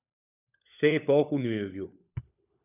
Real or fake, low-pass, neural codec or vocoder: fake; 3.6 kHz; codec, 16 kHz, 16 kbps, FunCodec, trained on LibriTTS, 50 frames a second